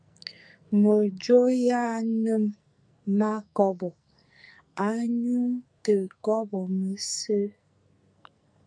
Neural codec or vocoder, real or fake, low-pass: codec, 44.1 kHz, 2.6 kbps, SNAC; fake; 9.9 kHz